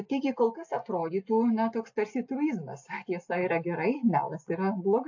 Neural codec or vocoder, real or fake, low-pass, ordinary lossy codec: none; real; 7.2 kHz; AAC, 48 kbps